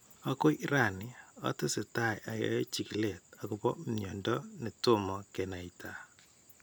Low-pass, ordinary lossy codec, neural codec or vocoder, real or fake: none; none; none; real